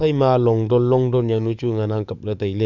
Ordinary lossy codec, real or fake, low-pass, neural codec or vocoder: none; fake; 7.2 kHz; codec, 44.1 kHz, 7.8 kbps, DAC